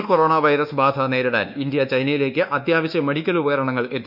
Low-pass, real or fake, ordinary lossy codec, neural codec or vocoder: 5.4 kHz; fake; none; codec, 24 kHz, 1.2 kbps, DualCodec